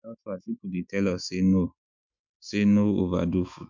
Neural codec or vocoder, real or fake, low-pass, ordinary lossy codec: autoencoder, 48 kHz, 128 numbers a frame, DAC-VAE, trained on Japanese speech; fake; 7.2 kHz; MP3, 64 kbps